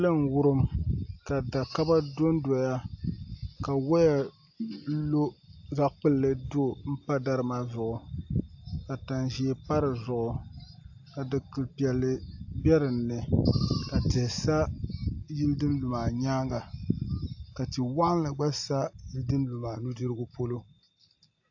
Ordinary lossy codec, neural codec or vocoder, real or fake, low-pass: AAC, 48 kbps; none; real; 7.2 kHz